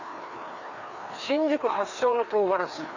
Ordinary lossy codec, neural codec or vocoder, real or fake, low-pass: Opus, 64 kbps; codec, 16 kHz, 2 kbps, FreqCodec, larger model; fake; 7.2 kHz